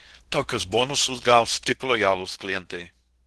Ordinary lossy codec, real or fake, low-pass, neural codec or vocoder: Opus, 16 kbps; fake; 10.8 kHz; codec, 16 kHz in and 24 kHz out, 0.8 kbps, FocalCodec, streaming, 65536 codes